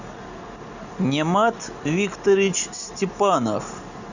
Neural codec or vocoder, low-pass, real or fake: none; 7.2 kHz; real